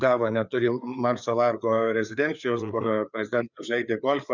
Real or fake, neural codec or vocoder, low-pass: fake; codec, 16 kHz, 4 kbps, FreqCodec, larger model; 7.2 kHz